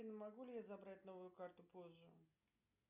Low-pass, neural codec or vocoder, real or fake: 3.6 kHz; none; real